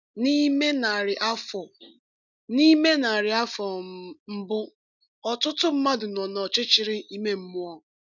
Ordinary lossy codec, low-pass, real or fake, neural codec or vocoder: none; 7.2 kHz; real; none